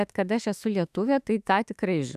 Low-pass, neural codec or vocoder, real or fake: 14.4 kHz; autoencoder, 48 kHz, 32 numbers a frame, DAC-VAE, trained on Japanese speech; fake